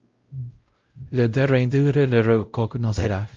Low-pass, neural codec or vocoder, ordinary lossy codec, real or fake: 7.2 kHz; codec, 16 kHz, 0.5 kbps, X-Codec, WavLM features, trained on Multilingual LibriSpeech; Opus, 24 kbps; fake